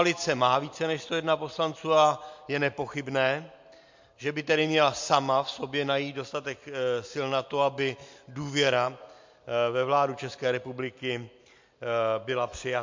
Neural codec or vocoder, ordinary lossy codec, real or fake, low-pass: none; MP3, 48 kbps; real; 7.2 kHz